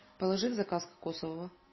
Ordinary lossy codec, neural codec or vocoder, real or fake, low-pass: MP3, 24 kbps; none; real; 7.2 kHz